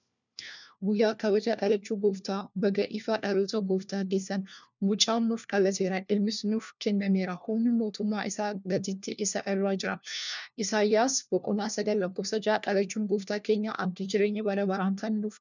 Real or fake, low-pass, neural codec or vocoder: fake; 7.2 kHz; codec, 16 kHz, 1 kbps, FunCodec, trained on LibriTTS, 50 frames a second